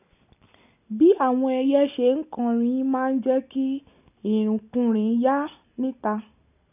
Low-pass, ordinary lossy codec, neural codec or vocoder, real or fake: 3.6 kHz; none; none; real